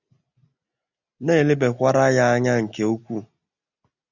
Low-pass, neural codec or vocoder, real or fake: 7.2 kHz; none; real